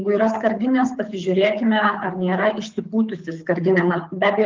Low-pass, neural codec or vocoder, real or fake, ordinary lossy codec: 7.2 kHz; codec, 24 kHz, 6 kbps, HILCodec; fake; Opus, 24 kbps